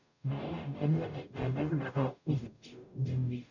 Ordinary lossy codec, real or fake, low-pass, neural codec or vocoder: none; fake; 7.2 kHz; codec, 44.1 kHz, 0.9 kbps, DAC